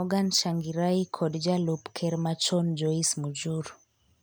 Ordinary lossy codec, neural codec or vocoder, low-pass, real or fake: none; none; none; real